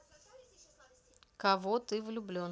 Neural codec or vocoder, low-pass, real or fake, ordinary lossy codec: none; none; real; none